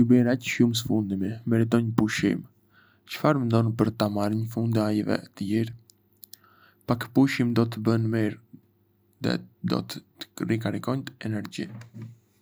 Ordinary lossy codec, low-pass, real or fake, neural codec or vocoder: none; none; real; none